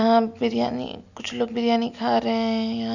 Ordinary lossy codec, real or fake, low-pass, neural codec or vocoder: none; real; 7.2 kHz; none